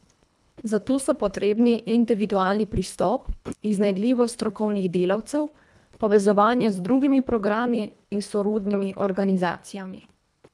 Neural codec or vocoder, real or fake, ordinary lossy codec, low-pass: codec, 24 kHz, 1.5 kbps, HILCodec; fake; none; none